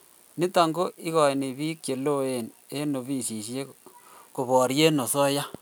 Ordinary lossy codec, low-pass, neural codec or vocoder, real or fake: none; none; none; real